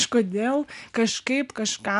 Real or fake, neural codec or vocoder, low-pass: real; none; 10.8 kHz